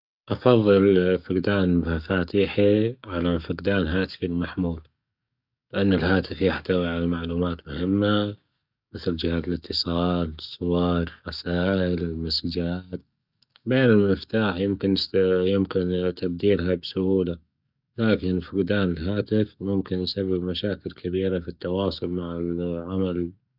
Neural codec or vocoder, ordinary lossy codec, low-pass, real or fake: codec, 44.1 kHz, 7.8 kbps, DAC; none; 5.4 kHz; fake